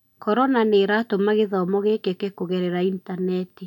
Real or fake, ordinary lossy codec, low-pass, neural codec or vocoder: real; none; 19.8 kHz; none